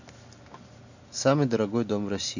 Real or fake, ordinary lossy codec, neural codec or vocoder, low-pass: real; none; none; 7.2 kHz